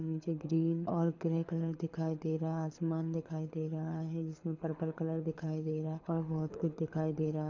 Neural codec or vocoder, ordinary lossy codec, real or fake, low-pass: codec, 24 kHz, 6 kbps, HILCodec; none; fake; 7.2 kHz